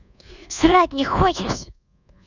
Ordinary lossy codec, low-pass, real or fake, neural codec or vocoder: none; 7.2 kHz; fake; codec, 24 kHz, 1.2 kbps, DualCodec